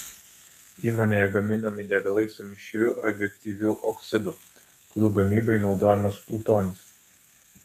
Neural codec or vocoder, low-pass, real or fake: codec, 32 kHz, 1.9 kbps, SNAC; 14.4 kHz; fake